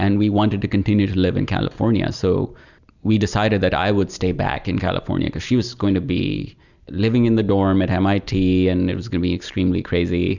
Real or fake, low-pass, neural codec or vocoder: real; 7.2 kHz; none